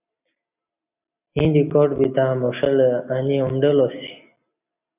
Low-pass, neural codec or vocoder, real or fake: 3.6 kHz; none; real